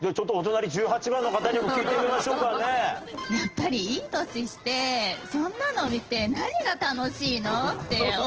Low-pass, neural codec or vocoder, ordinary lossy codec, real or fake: 7.2 kHz; none; Opus, 16 kbps; real